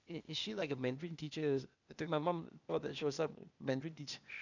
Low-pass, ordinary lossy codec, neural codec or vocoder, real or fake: 7.2 kHz; none; codec, 16 kHz, 0.8 kbps, ZipCodec; fake